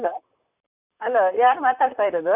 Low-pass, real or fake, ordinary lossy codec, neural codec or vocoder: 3.6 kHz; fake; none; vocoder, 44.1 kHz, 128 mel bands, Pupu-Vocoder